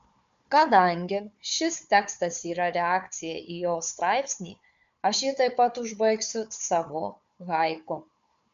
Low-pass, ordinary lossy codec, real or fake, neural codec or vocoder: 7.2 kHz; MP3, 64 kbps; fake; codec, 16 kHz, 4 kbps, FunCodec, trained on Chinese and English, 50 frames a second